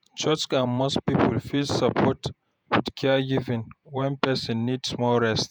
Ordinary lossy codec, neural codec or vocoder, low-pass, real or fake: none; vocoder, 48 kHz, 128 mel bands, Vocos; none; fake